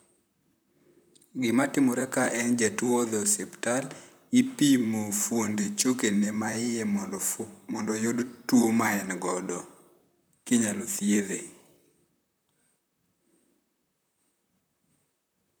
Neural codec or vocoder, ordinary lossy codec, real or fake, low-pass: vocoder, 44.1 kHz, 128 mel bands, Pupu-Vocoder; none; fake; none